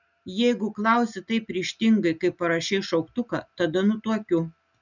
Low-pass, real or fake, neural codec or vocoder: 7.2 kHz; real; none